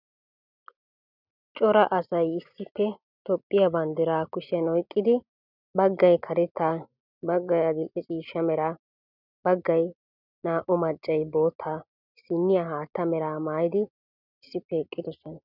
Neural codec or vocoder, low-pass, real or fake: none; 5.4 kHz; real